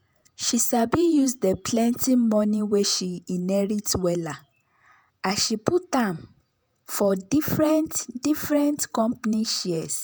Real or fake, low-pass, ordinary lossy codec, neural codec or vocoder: fake; none; none; vocoder, 48 kHz, 128 mel bands, Vocos